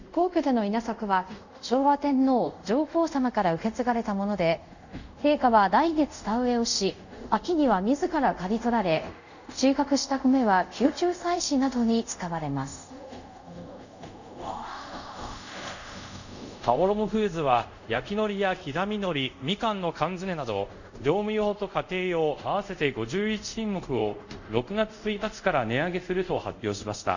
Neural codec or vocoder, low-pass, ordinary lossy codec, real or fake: codec, 24 kHz, 0.5 kbps, DualCodec; 7.2 kHz; none; fake